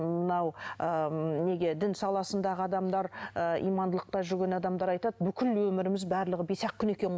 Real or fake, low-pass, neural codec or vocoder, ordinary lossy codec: real; none; none; none